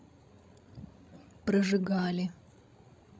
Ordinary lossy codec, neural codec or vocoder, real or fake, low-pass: none; codec, 16 kHz, 16 kbps, FreqCodec, larger model; fake; none